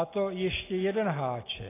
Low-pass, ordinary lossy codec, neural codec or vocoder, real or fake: 3.6 kHz; AAC, 16 kbps; none; real